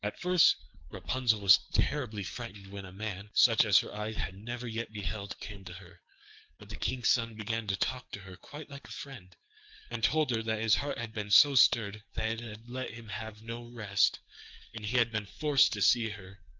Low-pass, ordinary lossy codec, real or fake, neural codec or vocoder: 7.2 kHz; Opus, 24 kbps; fake; codec, 44.1 kHz, 7.8 kbps, DAC